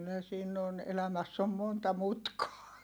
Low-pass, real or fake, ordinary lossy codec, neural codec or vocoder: none; real; none; none